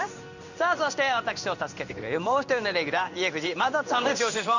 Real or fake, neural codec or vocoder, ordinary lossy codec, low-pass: fake; codec, 16 kHz in and 24 kHz out, 1 kbps, XY-Tokenizer; none; 7.2 kHz